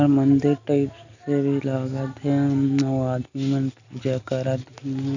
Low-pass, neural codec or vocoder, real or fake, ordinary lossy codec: 7.2 kHz; none; real; none